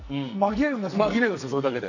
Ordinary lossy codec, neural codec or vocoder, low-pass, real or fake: none; codec, 44.1 kHz, 2.6 kbps, SNAC; 7.2 kHz; fake